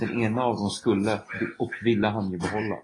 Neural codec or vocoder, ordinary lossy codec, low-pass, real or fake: none; AAC, 32 kbps; 10.8 kHz; real